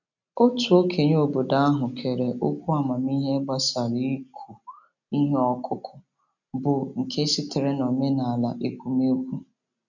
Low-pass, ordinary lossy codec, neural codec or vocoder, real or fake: 7.2 kHz; none; none; real